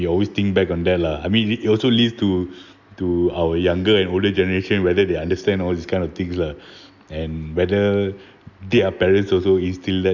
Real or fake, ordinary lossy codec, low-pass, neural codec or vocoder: real; none; 7.2 kHz; none